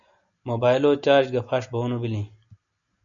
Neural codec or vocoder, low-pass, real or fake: none; 7.2 kHz; real